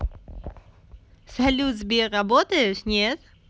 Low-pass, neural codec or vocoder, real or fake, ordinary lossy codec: none; none; real; none